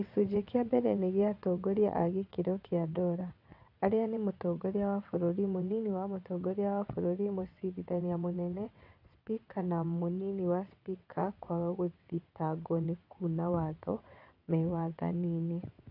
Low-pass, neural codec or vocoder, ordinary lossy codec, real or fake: 5.4 kHz; vocoder, 22.05 kHz, 80 mel bands, WaveNeXt; AAC, 32 kbps; fake